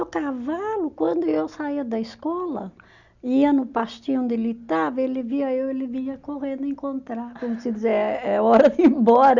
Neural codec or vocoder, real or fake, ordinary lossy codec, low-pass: none; real; none; 7.2 kHz